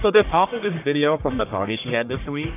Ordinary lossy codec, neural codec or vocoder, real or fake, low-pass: AAC, 32 kbps; codec, 44.1 kHz, 1.7 kbps, Pupu-Codec; fake; 3.6 kHz